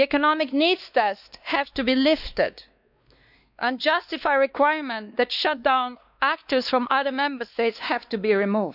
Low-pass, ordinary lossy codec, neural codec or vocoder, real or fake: 5.4 kHz; none; codec, 16 kHz, 2 kbps, X-Codec, WavLM features, trained on Multilingual LibriSpeech; fake